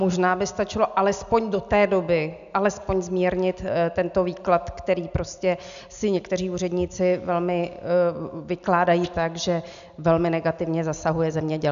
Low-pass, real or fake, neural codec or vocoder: 7.2 kHz; real; none